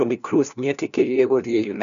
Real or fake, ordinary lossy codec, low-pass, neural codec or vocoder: fake; none; 7.2 kHz; codec, 16 kHz, 1 kbps, FunCodec, trained on LibriTTS, 50 frames a second